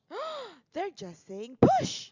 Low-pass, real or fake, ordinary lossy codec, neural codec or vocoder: 7.2 kHz; real; Opus, 64 kbps; none